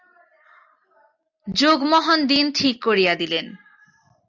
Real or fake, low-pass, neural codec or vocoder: real; 7.2 kHz; none